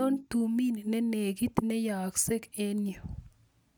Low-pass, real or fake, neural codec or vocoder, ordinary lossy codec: none; real; none; none